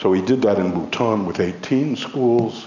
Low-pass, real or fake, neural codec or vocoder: 7.2 kHz; real; none